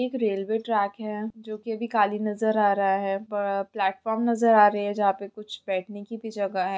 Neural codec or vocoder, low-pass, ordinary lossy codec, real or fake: none; none; none; real